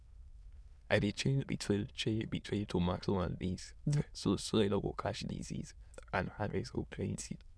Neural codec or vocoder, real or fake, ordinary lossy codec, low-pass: autoencoder, 22.05 kHz, a latent of 192 numbers a frame, VITS, trained on many speakers; fake; none; none